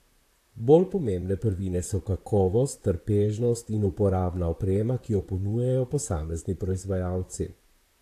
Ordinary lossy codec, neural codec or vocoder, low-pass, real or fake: AAC, 64 kbps; vocoder, 44.1 kHz, 128 mel bands, Pupu-Vocoder; 14.4 kHz; fake